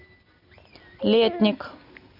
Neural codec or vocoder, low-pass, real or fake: none; 5.4 kHz; real